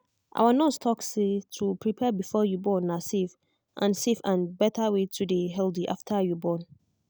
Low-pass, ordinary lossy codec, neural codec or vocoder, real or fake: none; none; none; real